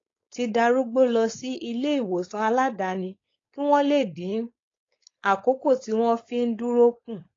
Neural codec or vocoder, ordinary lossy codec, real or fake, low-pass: codec, 16 kHz, 4.8 kbps, FACodec; AAC, 32 kbps; fake; 7.2 kHz